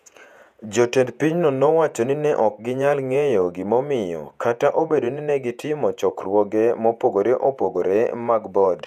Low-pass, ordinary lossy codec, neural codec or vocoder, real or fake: 14.4 kHz; none; vocoder, 48 kHz, 128 mel bands, Vocos; fake